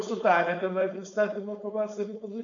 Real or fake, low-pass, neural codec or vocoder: fake; 7.2 kHz; codec, 16 kHz, 4.8 kbps, FACodec